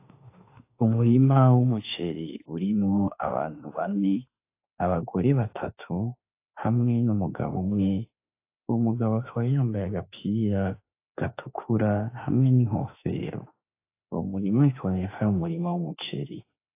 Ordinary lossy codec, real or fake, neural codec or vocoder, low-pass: AAC, 24 kbps; fake; autoencoder, 48 kHz, 32 numbers a frame, DAC-VAE, trained on Japanese speech; 3.6 kHz